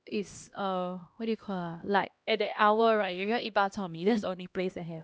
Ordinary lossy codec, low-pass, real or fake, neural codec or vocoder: none; none; fake; codec, 16 kHz, 1 kbps, X-Codec, HuBERT features, trained on LibriSpeech